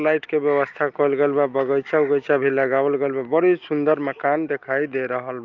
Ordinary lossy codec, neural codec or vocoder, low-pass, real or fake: Opus, 24 kbps; none; 7.2 kHz; real